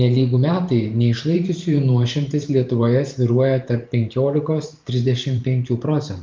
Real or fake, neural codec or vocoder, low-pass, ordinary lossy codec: fake; vocoder, 44.1 kHz, 128 mel bands every 512 samples, BigVGAN v2; 7.2 kHz; Opus, 24 kbps